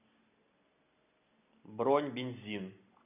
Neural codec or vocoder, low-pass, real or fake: none; 3.6 kHz; real